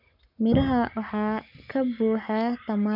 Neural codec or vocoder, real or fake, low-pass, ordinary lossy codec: codec, 44.1 kHz, 7.8 kbps, Pupu-Codec; fake; 5.4 kHz; none